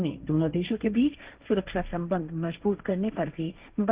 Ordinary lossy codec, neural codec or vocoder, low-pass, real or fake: Opus, 32 kbps; codec, 16 kHz, 1.1 kbps, Voila-Tokenizer; 3.6 kHz; fake